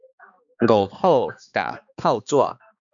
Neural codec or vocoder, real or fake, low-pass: codec, 16 kHz, 2 kbps, X-Codec, HuBERT features, trained on balanced general audio; fake; 7.2 kHz